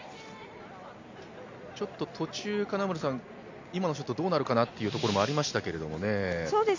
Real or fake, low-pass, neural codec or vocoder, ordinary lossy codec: real; 7.2 kHz; none; MP3, 64 kbps